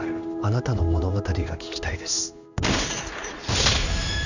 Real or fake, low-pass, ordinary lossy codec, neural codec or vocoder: fake; 7.2 kHz; none; codec, 16 kHz in and 24 kHz out, 1 kbps, XY-Tokenizer